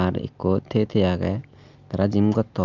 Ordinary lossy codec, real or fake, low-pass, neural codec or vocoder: Opus, 24 kbps; real; 7.2 kHz; none